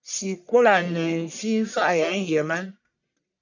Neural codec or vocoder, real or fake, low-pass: codec, 44.1 kHz, 1.7 kbps, Pupu-Codec; fake; 7.2 kHz